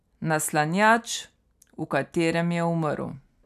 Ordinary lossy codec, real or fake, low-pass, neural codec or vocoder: none; real; 14.4 kHz; none